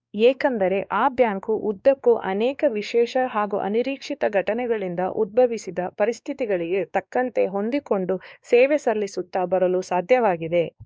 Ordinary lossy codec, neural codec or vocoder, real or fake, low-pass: none; codec, 16 kHz, 2 kbps, X-Codec, WavLM features, trained on Multilingual LibriSpeech; fake; none